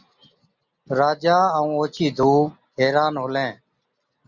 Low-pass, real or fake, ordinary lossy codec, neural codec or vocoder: 7.2 kHz; real; Opus, 64 kbps; none